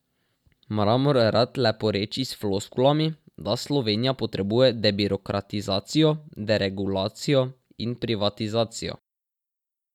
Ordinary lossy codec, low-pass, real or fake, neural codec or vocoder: none; 19.8 kHz; real; none